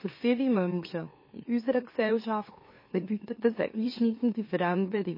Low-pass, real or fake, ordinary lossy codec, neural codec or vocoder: 5.4 kHz; fake; MP3, 24 kbps; autoencoder, 44.1 kHz, a latent of 192 numbers a frame, MeloTTS